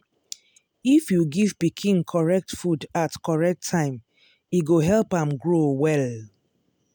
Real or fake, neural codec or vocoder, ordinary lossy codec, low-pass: real; none; none; none